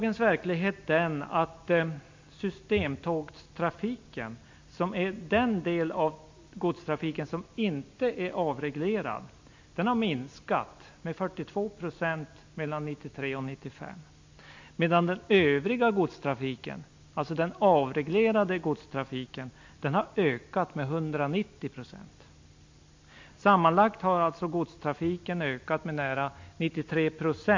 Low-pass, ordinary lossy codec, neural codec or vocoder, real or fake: 7.2 kHz; MP3, 48 kbps; none; real